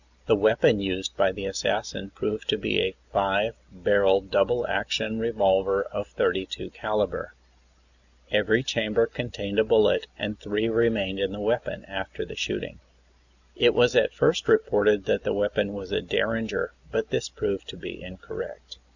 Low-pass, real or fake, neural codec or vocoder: 7.2 kHz; real; none